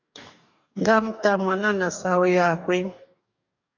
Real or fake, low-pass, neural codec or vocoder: fake; 7.2 kHz; codec, 44.1 kHz, 2.6 kbps, DAC